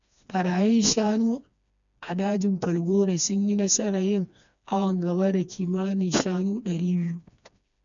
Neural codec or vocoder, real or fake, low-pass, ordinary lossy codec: codec, 16 kHz, 2 kbps, FreqCodec, smaller model; fake; 7.2 kHz; none